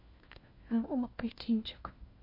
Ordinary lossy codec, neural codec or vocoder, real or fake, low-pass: MP3, 32 kbps; codec, 16 kHz, 1 kbps, FunCodec, trained on LibriTTS, 50 frames a second; fake; 5.4 kHz